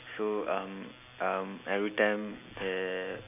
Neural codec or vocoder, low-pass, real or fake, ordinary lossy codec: none; 3.6 kHz; real; none